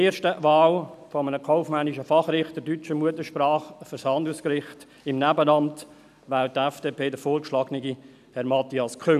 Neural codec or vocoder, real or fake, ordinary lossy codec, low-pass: none; real; none; 14.4 kHz